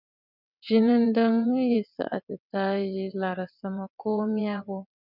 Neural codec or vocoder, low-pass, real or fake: vocoder, 22.05 kHz, 80 mel bands, WaveNeXt; 5.4 kHz; fake